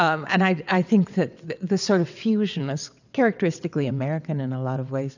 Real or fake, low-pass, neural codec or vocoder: fake; 7.2 kHz; vocoder, 22.05 kHz, 80 mel bands, WaveNeXt